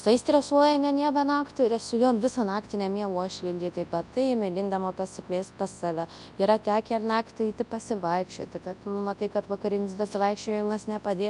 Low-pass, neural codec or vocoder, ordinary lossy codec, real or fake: 10.8 kHz; codec, 24 kHz, 0.9 kbps, WavTokenizer, large speech release; AAC, 96 kbps; fake